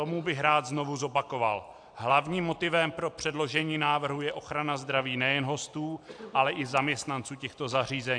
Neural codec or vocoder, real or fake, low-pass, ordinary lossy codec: none; real; 9.9 kHz; AAC, 64 kbps